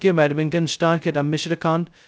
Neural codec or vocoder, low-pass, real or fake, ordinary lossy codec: codec, 16 kHz, 0.2 kbps, FocalCodec; none; fake; none